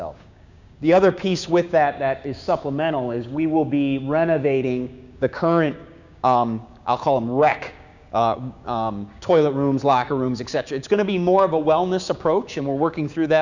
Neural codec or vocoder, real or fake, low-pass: codec, 16 kHz, 6 kbps, DAC; fake; 7.2 kHz